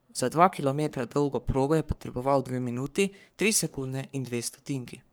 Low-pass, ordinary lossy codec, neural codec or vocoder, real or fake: none; none; codec, 44.1 kHz, 3.4 kbps, Pupu-Codec; fake